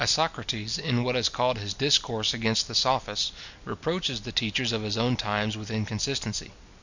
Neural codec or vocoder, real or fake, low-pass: none; real; 7.2 kHz